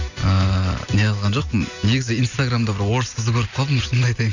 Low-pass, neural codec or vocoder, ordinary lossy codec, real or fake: 7.2 kHz; none; none; real